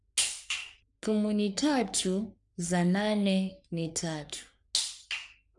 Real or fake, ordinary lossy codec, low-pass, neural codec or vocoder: fake; none; 10.8 kHz; codec, 44.1 kHz, 3.4 kbps, Pupu-Codec